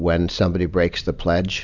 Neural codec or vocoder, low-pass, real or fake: none; 7.2 kHz; real